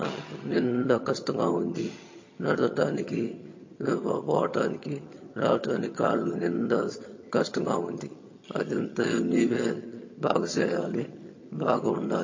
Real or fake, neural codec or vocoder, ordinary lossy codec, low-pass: fake; vocoder, 22.05 kHz, 80 mel bands, HiFi-GAN; MP3, 32 kbps; 7.2 kHz